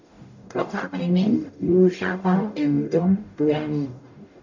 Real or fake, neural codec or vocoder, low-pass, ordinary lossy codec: fake; codec, 44.1 kHz, 0.9 kbps, DAC; 7.2 kHz; none